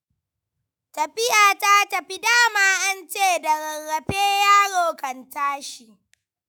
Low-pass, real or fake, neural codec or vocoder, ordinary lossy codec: none; fake; autoencoder, 48 kHz, 128 numbers a frame, DAC-VAE, trained on Japanese speech; none